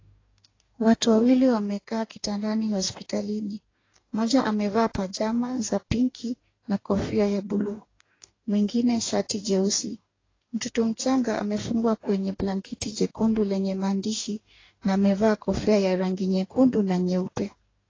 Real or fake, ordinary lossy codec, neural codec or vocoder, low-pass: fake; AAC, 32 kbps; codec, 44.1 kHz, 2.6 kbps, DAC; 7.2 kHz